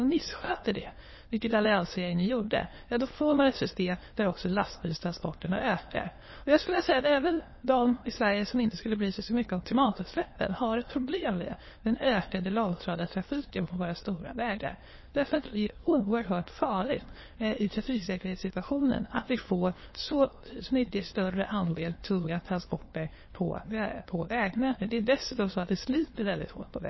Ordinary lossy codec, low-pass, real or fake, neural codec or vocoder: MP3, 24 kbps; 7.2 kHz; fake; autoencoder, 22.05 kHz, a latent of 192 numbers a frame, VITS, trained on many speakers